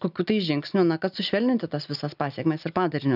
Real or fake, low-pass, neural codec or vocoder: real; 5.4 kHz; none